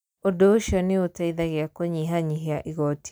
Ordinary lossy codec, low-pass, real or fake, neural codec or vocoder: none; none; real; none